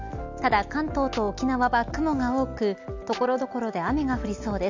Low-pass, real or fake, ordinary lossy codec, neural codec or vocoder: 7.2 kHz; real; MP3, 64 kbps; none